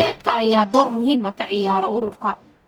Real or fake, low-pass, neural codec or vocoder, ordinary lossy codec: fake; none; codec, 44.1 kHz, 0.9 kbps, DAC; none